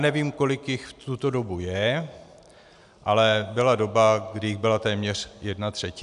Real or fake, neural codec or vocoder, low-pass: real; none; 10.8 kHz